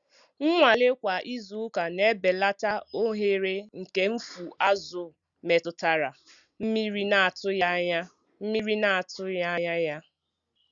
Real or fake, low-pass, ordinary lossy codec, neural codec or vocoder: real; 7.2 kHz; Opus, 64 kbps; none